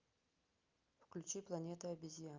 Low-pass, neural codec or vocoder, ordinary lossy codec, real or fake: 7.2 kHz; none; Opus, 24 kbps; real